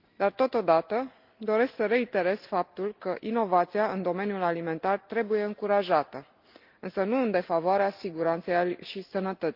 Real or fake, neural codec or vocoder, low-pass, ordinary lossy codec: real; none; 5.4 kHz; Opus, 32 kbps